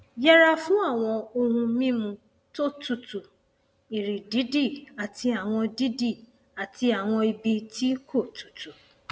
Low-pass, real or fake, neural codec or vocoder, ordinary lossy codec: none; real; none; none